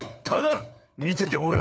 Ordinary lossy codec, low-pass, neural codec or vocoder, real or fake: none; none; codec, 16 kHz, 4 kbps, FunCodec, trained on LibriTTS, 50 frames a second; fake